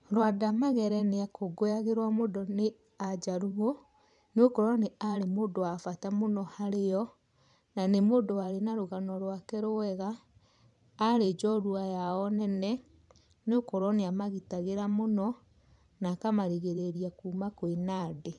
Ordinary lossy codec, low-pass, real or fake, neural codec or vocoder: none; 10.8 kHz; fake; vocoder, 44.1 kHz, 128 mel bands every 512 samples, BigVGAN v2